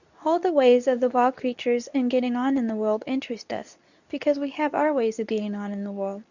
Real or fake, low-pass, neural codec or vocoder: fake; 7.2 kHz; codec, 24 kHz, 0.9 kbps, WavTokenizer, medium speech release version 2